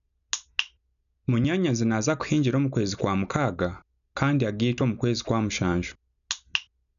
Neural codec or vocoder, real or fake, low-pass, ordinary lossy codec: none; real; 7.2 kHz; none